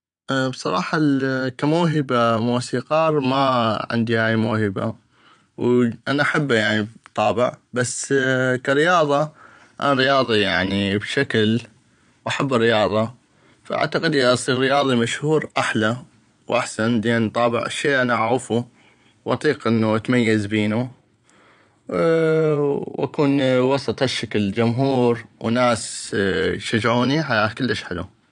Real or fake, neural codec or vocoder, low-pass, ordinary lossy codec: fake; vocoder, 22.05 kHz, 80 mel bands, Vocos; 9.9 kHz; none